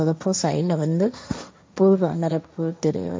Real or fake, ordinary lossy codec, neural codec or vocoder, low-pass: fake; none; codec, 16 kHz, 1.1 kbps, Voila-Tokenizer; none